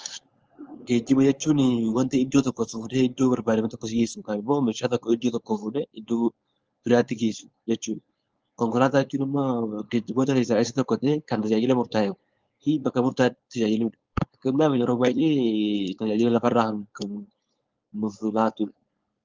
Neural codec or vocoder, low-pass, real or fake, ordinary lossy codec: codec, 16 kHz, 4.8 kbps, FACodec; 7.2 kHz; fake; Opus, 24 kbps